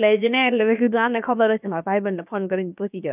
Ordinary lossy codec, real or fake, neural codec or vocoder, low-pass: none; fake; codec, 16 kHz, about 1 kbps, DyCAST, with the encoder's durations; 3.6 kHz